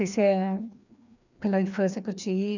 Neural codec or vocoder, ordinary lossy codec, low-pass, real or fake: codec, 16 kHz, 2 kbps, FreqCodec, larger model; none; 7.2 kHz; fake